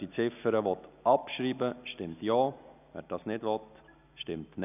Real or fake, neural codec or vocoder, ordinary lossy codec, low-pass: real; none; none; 3.6 kHz